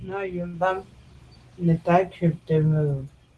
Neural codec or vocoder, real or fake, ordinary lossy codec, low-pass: none; real; Opus, 16 kbps; 10.8 kHz